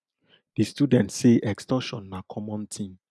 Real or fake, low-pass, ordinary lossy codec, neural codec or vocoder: real; none; none; none